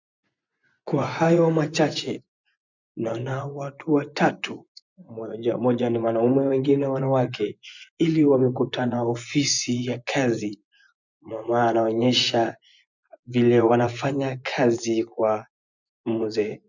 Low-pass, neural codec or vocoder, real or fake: 7.2 kHz; vocoder, 24 kHz, 100 mel bands, Vocos; fake